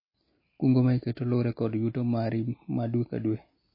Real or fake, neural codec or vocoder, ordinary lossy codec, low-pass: real; none; MP3, 24 kbps; 5.4 kHz